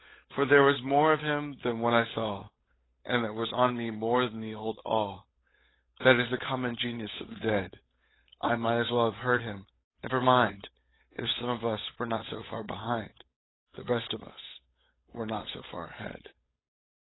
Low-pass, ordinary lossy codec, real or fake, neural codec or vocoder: 7.2 kHz; AAC, 16 kbps; fake; codec, 16 kHz, 8 kbps, FunCodec, trained on Chinese and English, 25 frames a second